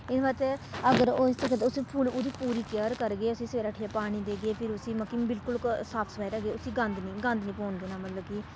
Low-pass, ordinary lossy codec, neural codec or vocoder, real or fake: none; none; none; real